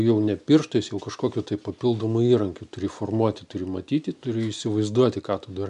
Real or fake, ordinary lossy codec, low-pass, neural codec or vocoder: real; MP3, 96 kbps; 10.8 kHz; none